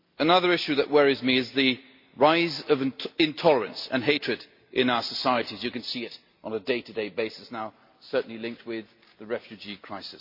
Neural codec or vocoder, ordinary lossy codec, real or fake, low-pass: none; MP3, 32 kbps; real; 5.4 kHz